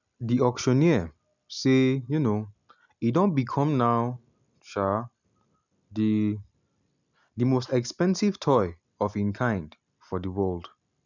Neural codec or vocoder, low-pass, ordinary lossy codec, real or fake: none; 7.2 kHz; none; real